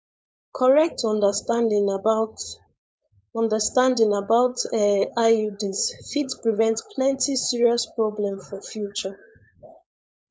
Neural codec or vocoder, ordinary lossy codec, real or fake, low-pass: codec, 16 kHz, 4.8 kbps, FACodec; none; fake; none